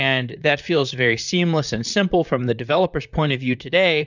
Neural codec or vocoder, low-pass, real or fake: vocoder, 44.1 kHz, 128 mel bands, Pupu-Vocoder; 7.2 kHz; fake